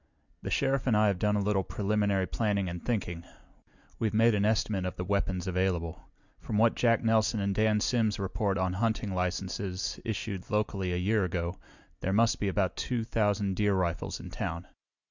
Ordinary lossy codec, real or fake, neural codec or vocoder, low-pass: Opus, 64 kbps; real; none; 7.2 kHz